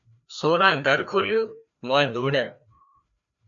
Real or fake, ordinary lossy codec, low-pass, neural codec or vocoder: fake; MP3, 64 kbps; 7.2 kHz; codec, 16 kHz, 1 kbps, FreqCodec, larger model